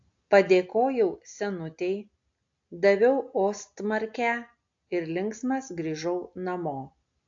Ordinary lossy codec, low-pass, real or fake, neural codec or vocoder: AAC, 48 kbps; 7.2 kHz; real; none